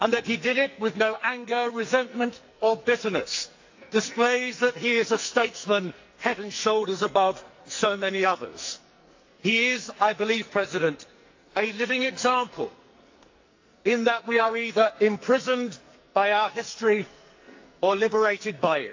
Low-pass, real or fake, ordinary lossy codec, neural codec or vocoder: 7.2 kHz; fake; AAC, 48 kbps; codec, 44.1 kHz, 2.6 kbps, SNAC